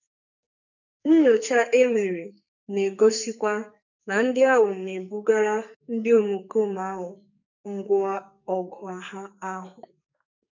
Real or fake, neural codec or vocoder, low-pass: fake; codec, 44.1 kHz, 2.6 kbps, SNAC; 7.2 kHz